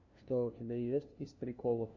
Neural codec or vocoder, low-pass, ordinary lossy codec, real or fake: codec, 16 kHz, 0.5 kbps, FunCodec, trained on LibriTTS, 25 frames a second; 7.2 kHz; AAC, 48 kbps; fake